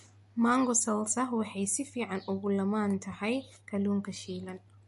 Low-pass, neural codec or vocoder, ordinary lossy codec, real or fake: 14.4 kHz; none; MP3, 48 kbps; real